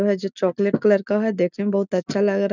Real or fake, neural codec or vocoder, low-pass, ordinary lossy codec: real; none; 7.2 kHz; none